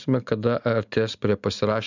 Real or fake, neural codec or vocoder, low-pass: real; none; 7.2 kHz